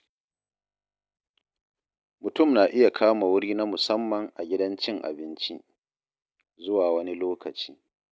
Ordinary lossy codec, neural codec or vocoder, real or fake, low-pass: none; none; real; none